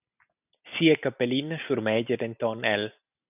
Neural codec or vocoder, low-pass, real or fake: none; 3.6 kHz; real